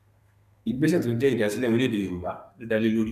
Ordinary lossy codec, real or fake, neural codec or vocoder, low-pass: none; fake; codec, 32 kHz, 1.9 kbps, SNAC; 14.4 kHz